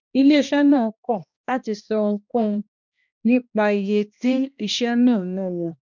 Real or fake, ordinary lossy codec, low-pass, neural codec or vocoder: fake; none; 7.2 kHz; codec, 16 kHz, 1 kbps, X-Codec, HuBERT features, trained on balanced general audio